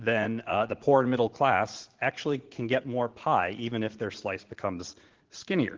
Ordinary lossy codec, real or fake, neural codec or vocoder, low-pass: Opus, 16 kbps; fake; vocoder, 44.1 kHz, 80 mel bands, Vocos; 7.2 kHz